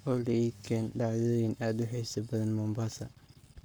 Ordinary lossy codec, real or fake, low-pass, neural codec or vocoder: none; fake; none; codec, 44.1 kHz, 7.8 kbps, Pupu-Codec